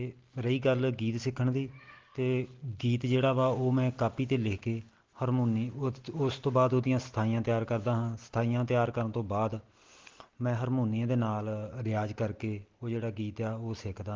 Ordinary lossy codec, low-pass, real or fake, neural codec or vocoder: Opus, 16 kbps; 7.2 kHz; real; none